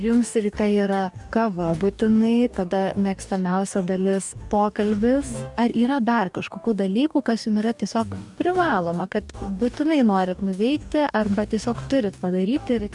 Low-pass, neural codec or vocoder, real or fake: 10.8 kHz; codec, 44.1 kHz, 2.6 kbps, DAC; fake